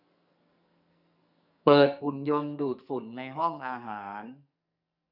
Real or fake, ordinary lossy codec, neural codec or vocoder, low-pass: fake; none; codec, 44.1 kHz, 2.6 kbps, SNAC; 5.4 kHz